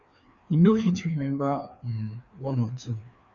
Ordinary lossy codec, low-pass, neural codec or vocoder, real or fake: none; 7.2 kHz; codec, 16 kHz, 2 kbps, FreqCodec, larger model; fake